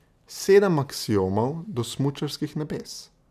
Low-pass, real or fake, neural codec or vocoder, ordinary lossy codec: 14.4 kHz; real; none; none